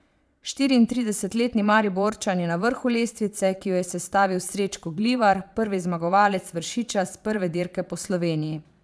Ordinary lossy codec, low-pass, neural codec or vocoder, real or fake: none; none; vocoder, 22.05 kHz, 80 mel bands, Vocos; fake